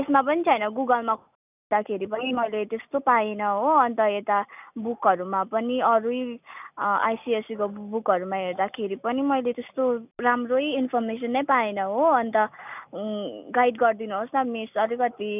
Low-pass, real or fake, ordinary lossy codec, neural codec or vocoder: 3.6 kHz; real; none; none